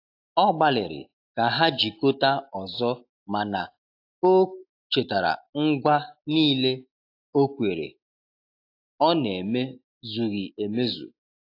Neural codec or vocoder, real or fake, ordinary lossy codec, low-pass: none; real; AAC, 32 kbps; 5.4 kHz